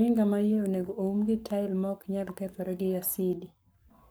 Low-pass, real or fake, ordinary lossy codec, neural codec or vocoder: none; fake; none; codec, 44.1 kHz, 7.8 kbps, Pupu-Codec